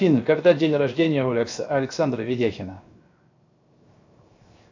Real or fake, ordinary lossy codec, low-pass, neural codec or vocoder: fake; AAC, 48 kbps; 7.2 kHz; codec, 16 kHz, 0.7 kbps, FocalCodec